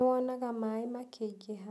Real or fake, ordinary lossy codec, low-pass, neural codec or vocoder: real; none; none; none